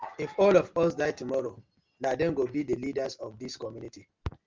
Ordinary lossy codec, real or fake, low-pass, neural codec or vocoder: Opus, 32 kbps; real; 7.2 kHz; none